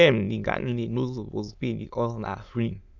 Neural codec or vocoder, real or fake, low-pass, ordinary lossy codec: autoencoder, 22.05 kHz, a latent of 192 numbers a frame, VITS, trained on many speakers; fake; 7.2 kHz; none